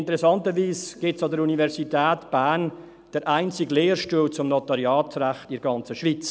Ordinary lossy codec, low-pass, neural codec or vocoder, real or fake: none; none; none; real